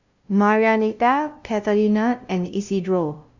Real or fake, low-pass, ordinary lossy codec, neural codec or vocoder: fake; 7.2 kHz; none; codec, 16 kHz, 0.5 kbps, FunCodec, trained on LibriTTS, 25 frames a second